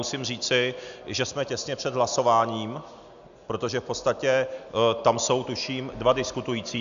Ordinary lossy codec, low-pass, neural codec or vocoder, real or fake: AAC, 96 kbps; 7.2 kHz; none; real